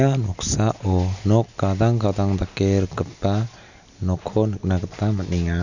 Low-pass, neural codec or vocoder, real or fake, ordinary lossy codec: 7.2 kHz; none; real; none